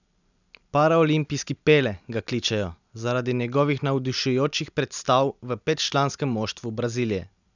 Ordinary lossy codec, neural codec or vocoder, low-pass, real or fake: none; none; 7.2 kHz; real